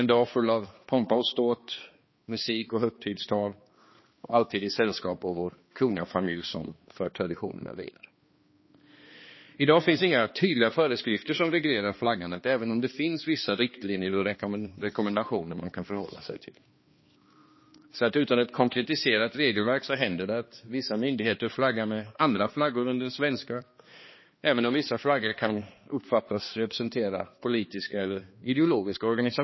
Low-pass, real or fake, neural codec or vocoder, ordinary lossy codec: 7.2 kHz; fake; codec, 16 kHz, 2 kbps, X-Codec, HuBERT features, trained on balanced general audio; MP3, 24 kbps